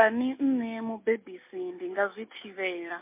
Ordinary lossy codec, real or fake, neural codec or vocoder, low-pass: MP3, 24 kbps; real; none; 3.6 kHz